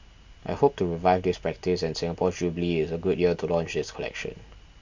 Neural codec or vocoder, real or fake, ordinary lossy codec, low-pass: none; real; MP3, 64 kbps; 7.2 kHz